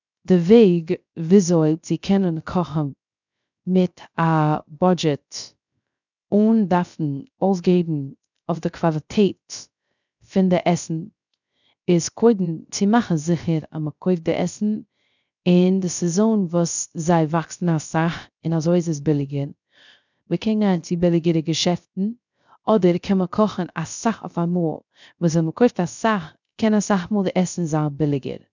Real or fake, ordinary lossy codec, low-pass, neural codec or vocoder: fake; none; 7.2 kHz; codec, 16 kHz, 0.3 kbps, FocalCodec